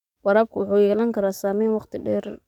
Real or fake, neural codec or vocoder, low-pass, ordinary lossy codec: fake; codec, 44.1 kHz, 7.8 kbps, DAC; 19.8 kHz; none